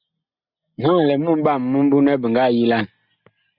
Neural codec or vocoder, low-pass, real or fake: none; 5.4 kHz; real